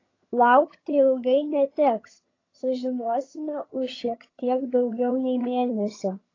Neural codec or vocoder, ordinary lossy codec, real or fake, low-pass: vocoder, 22.05 kHz, 80 mel bands, HiFi-GAN; AAC, 32 kbps; fake; 7.2 kHz